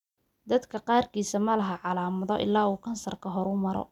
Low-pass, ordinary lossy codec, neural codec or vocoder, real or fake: 19.8 kHz; none; none; real